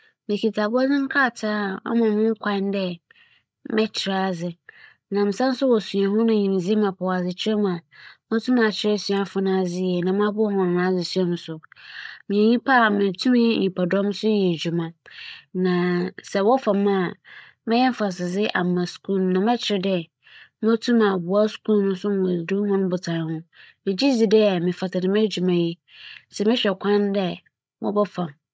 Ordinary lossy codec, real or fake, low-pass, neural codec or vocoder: none; fake; none; codec, 16 kHz, 16 kbps, FreqCodec, larger model